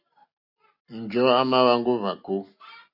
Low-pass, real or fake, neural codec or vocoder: 5.4 kHz; real; none